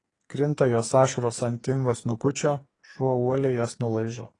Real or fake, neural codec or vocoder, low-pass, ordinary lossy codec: fake; codec, 44.1 kHz, 2.6 kbps, SNAC; 10.8 kHz; AAC, 32 kbps